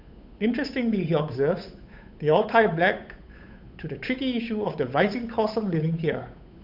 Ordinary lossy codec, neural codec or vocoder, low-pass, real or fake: none; codec, 16 kHz, 8 kbps, FunCodec, trained on Chinese and English, 25 frames a second; 5.4 kHz; fake